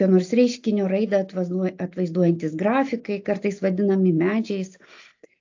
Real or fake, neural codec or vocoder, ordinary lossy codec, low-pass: real; none; AAC, 48 kbps; 7.2 kHz